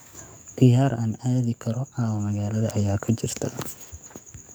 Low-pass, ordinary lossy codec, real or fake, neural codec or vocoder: none; none; fake; codec, 44.1 kHz, 7.8 kbps, DAC